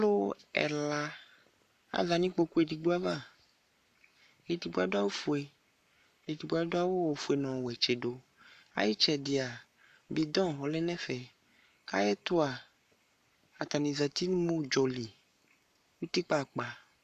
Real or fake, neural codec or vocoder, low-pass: fake; codec, 44.1 kHz, 7.8 kbps, Pupu-Codec; 14.4 kHz